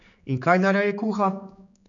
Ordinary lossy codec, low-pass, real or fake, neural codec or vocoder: none; 7.2 kHz; fake; codec, 16 kHz, 4 kbps, X-Codec, HuBERT features, trained on general audio